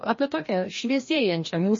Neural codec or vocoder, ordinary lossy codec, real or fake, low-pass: codec, 16 kHz, 1 kbps, FreqCodec, larger model; MP3, 32 kbps; fake; 7.2 kHz